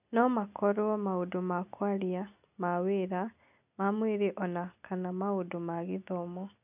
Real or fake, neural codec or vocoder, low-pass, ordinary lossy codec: real; none; 3.6 kHz; none